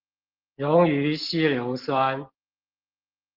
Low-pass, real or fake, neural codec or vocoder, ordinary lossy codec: 5.4 kHz; real; none; Opus, 16 kbps